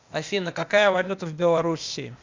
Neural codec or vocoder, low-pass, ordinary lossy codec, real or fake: codec, 16 kHz, 0.8 kbps, ZipCodec; 7.2 kHz; MP3, 64 kbps; fake